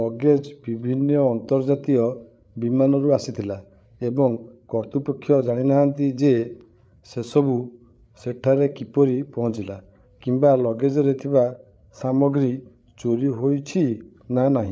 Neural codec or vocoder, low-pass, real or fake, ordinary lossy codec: codec, 16 kHz, 8 kbps, FreqCodec, larger model; none; fake; none